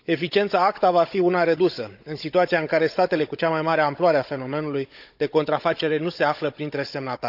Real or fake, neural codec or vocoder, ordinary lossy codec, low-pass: fake; codec, 16 kHz, 16 kbps, FunCodec, trained on Chinese and English, 50 frames a second; none; 5.4 kHz